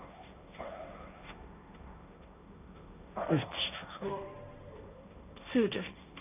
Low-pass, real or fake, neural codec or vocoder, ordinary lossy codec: 3.6 kHz; fake; codec, 16 kHz, 1.1 kbps, Voila-Tokenizer; none